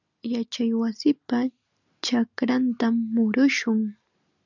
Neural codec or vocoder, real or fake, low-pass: none; real; 7.2 kHz